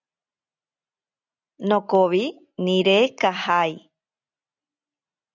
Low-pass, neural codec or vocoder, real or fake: 7.2 kHz; none; real